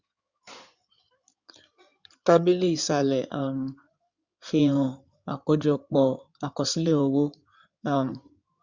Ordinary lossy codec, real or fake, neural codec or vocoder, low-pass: Opus, 64 kbps; fake; codec, 16 kHz in and 24 kHz out, 2.2 kbps, FireRedTTS-2 codec; 7.2 kHz